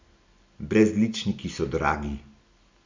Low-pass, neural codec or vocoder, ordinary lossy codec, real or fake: 7.2 kHz; none; MP3, 64 kbps; real